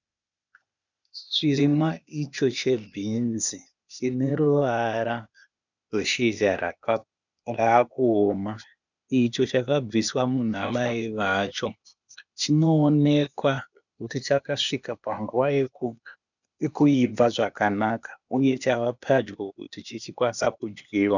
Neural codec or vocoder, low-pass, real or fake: codec, 16 kHz, 0.8 kbps, ZipCodec; 7.2 kHz; fake